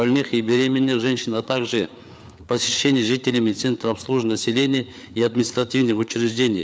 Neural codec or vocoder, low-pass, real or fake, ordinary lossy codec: codec, 16 kHz, 4 kbps, FreqCodec, larger model; none; fake; none